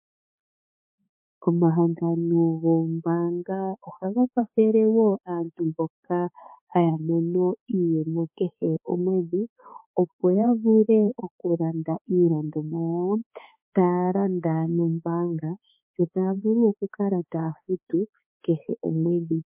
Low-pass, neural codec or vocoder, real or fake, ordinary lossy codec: 3.6 kHz; codec, 16 kHz, 4 kbps, X-Codec, HuBERT features, trained on balanced general audio; fake; MP3, 24 kbps